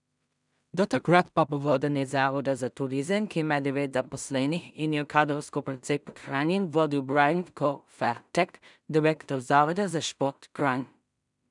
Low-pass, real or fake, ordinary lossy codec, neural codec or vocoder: 10.8 kHz; fake; none; codec, 16 kHz in and 24 kHz out, 0.4 kbps, LongCat-Audio-Codec, two codebook decoder